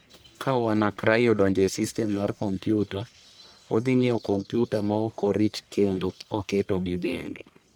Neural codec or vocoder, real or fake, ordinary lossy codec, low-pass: codec, 44.1 kHz, 1.7 kbps, Pupu-Codec; fake; none; none